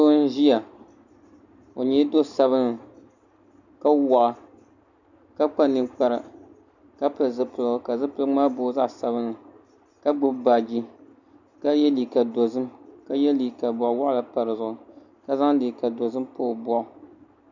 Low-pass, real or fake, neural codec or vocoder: 7.2 kHz; real; none